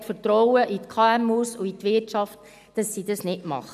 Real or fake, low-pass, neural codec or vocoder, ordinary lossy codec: real; 14.4 kHz; none; none